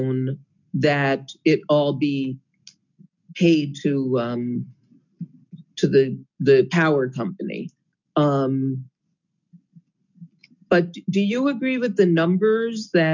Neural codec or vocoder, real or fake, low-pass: none; real; 7.2 kHz